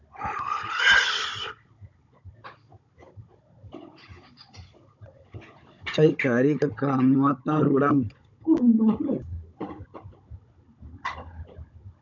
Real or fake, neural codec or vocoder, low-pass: fake; codec, 16 kHz, 16 kbps, FunCodec, trained on Chinese and English, 50 frames a second; 7.2 kHz